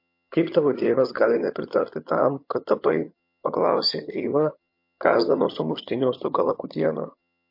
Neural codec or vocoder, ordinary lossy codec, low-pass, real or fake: vocoder, 22.05 kHz, 80 mel bands, HiFi-GAN; MP3, 32 kbps; 5.4 kHz; fake